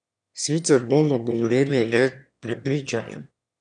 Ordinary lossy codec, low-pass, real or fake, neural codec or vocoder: none; 9.9 kHz; fake; autoencoder, 22.05 kHz, a latent of 192 numbers a frame, VITS, trained on one speaker